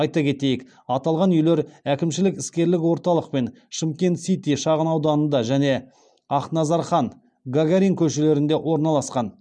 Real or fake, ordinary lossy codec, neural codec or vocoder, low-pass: real; none; none; 9.9 kHz